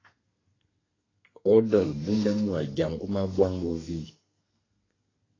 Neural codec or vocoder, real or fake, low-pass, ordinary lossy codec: codec, 32 kHz, 1.9 kbps, SNAC; fake; 7.2 kHz; AAC, 32 kbps